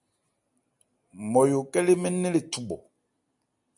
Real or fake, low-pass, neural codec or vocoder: real; 10.8 kHz; none